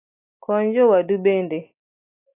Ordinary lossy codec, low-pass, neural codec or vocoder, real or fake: AAC, 24 kbps; 3.6 kHz; none; real